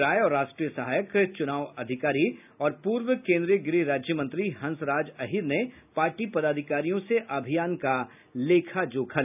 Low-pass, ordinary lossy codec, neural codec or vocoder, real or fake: 3.6 kHz; none; none; real